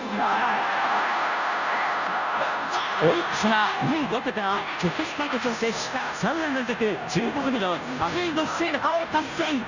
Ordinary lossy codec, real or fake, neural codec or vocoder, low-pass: none; fake; codec, 16 kHz, 0.5 kbps, FunCodec, trained on Chinese and English, 25 frames a second; 7.2 kHz